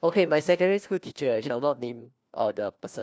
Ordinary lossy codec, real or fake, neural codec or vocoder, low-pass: none; fake; codec, 16 kHz, 1 kbps, FunCodec, trained on LibriTTS, 50 frames a second; none